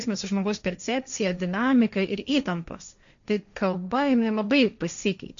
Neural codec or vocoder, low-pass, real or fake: codec, 16 kHz, 1.1 kbps, Voila-Tokenizer; 7.2 kHz; fake